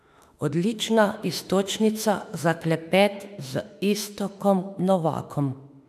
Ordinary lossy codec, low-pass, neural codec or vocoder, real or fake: none; 14.4 kHz; autoencoder, 48 kHz, 32 numbers a frame, DAC-VAE, trained on Japanese speech; fake